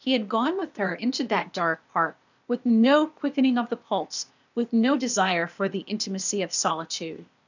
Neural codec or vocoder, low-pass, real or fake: codec, 16 kHz, 0.8 kbps, ZipCodec; 7.2 kHz; fake